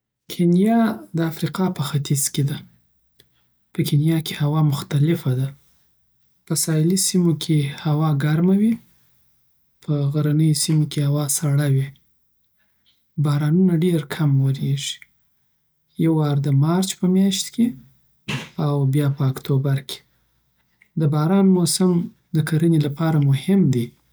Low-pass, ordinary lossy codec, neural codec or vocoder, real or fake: none; none; none; real